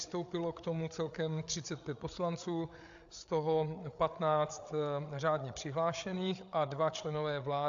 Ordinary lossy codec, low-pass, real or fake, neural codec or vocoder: AAC, 64 kbps; 7.2 kHz; fake; codec, 16 kHz, 8 kbps, FreqCodec, larger model